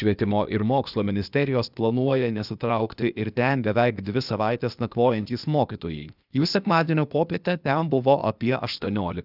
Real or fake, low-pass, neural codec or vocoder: fake; 5.4 kHz; codec, 16 kHz, 0.8 kbps, ZipCodec